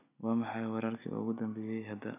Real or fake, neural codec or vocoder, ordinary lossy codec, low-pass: real; none; none; 3.6 kHz